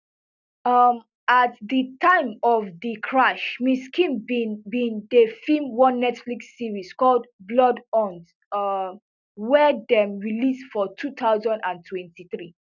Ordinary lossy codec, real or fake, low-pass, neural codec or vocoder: none; real; 7.2 kHz; none